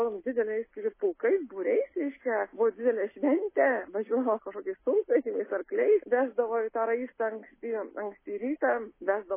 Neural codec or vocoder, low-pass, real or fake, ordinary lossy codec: none; 3.6 kHz; real; MP3, 16 kbps